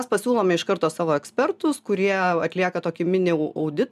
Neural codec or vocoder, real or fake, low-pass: none; real; 14.4 kHz